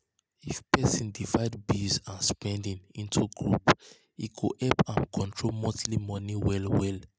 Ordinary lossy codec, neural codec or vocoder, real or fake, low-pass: none; none; real; none